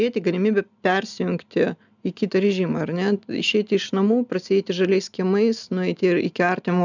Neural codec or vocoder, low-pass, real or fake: none; 7.2 kHz; real